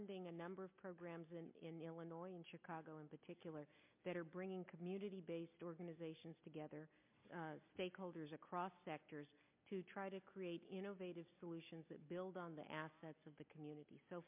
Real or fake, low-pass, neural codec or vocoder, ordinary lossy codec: real; 3.6 kHz; none; MP3, 32 kbps